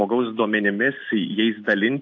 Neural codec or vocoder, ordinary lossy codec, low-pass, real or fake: none; AAC, 48 kbps; 7.2 kHz; real